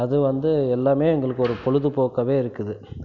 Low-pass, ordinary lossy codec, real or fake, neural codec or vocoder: 7.2 kHz; none; real; none